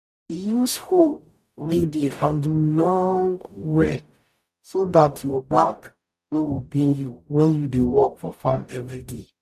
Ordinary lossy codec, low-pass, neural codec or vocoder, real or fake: none; 14.4 kHz; codec, 44.1 kHz, 0.9 kbps, DAC; fake